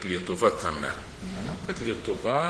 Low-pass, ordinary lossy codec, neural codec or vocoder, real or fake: 10.8 kHz; Opus, 16 kbps; autoencoder, 48 kHz, 32 numbers a frame, DAC-VAE, trained on Japanese speech; fake